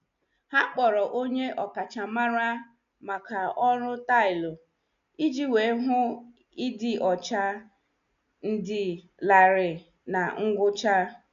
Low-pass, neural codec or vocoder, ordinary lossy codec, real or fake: 7.2 kHz; none; none; real